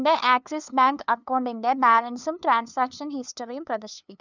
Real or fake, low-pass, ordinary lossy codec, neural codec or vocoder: fake; 7.2 kHz; none; codec, 16 kHz, 2 kbps, FunCodec, trained on LibriTTS, 25 frames a second